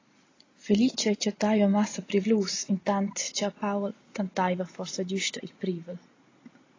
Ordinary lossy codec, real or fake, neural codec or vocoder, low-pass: AAC, 32 kbps; real; none; 7.2 kHz